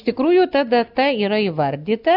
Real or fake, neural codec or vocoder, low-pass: real; none; 5.4 kHz